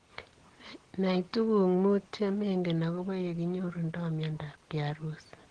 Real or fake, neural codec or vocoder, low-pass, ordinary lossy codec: real; none; 9.9 kHz; Opus, 16 kbps